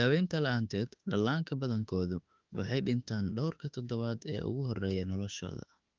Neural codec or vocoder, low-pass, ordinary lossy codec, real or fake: autoencoder, 48 kHz, 32 numbers a frame, DAC-VAE, trained on Japanese speech; 7.2 kHz; Opus, 32 kbps; fake